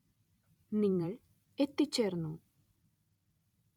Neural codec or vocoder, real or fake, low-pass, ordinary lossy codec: vocoder, 44.1 kHz, 128 mel bands every 256 samples, BigVGAN v2; fake; 19.8 kHz; none